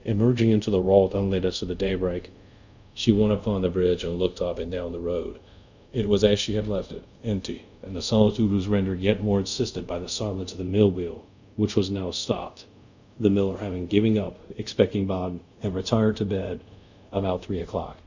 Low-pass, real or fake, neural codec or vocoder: 7.2 kHz; fake; codec, 24 kHz, 0.5 kbps, DualCodec